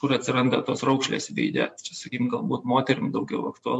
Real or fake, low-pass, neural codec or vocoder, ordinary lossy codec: real; 10.8 kHz; none; MP3, 64 kbps